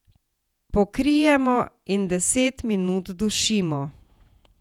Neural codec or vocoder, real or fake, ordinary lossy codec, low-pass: vocoder, 48 kHz, 128 mel bands, Vocos; fake; none; 19.8 kHz